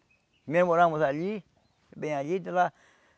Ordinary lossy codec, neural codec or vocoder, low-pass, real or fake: none; none; none; real